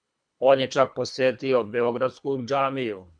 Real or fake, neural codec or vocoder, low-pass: fake; codec, 24 kHz, 3 kbps, HILCodec; 9.9 kHz